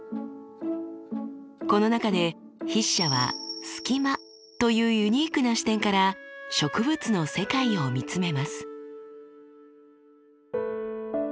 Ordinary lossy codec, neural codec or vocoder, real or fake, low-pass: none; none; real; none